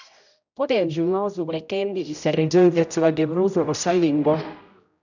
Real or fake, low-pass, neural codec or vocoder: fake; 7.2 kHz; codec, 16 kHz, 0.5 kbps, X-Codec, HuBERT features, trained on general audio